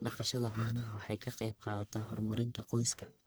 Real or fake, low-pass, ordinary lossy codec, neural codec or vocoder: fake; none; none; codec, 44.1 kHz, 1.7 kbps, Pupu-Codec